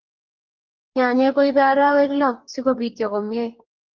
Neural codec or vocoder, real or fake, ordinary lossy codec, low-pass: codec, 44.1 kHz, 2.6 kbps, DAC; fake; Opus, 24 kbps; 7.2 kHz